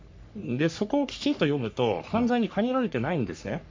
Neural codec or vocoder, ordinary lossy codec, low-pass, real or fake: codec, 44.1 kHz, 3.4 kbps, Pupu-Codec; MP3, 48 kbps; 7.2 kHz; fake